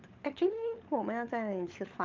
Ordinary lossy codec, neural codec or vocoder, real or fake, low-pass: Opus, 32 kbps; codec, 16 kHz, 16 kbps, FunCodec, trained on LibriTTS, 50 frames a second; fake; 7.2 kHz